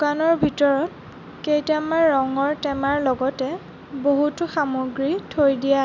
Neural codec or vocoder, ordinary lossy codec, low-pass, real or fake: none; none; 7.2 kHz; real